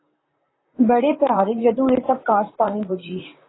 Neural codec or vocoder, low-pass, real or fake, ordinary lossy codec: vocoder, 44.1 kHz, 128 mel bands, Pupu-Vocoder; 7.2 kHz; fake; AAC, 16 kbps